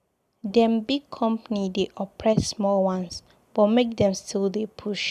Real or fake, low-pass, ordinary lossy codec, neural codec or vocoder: real; 14.4 kHz; MP3, 96 kbps; none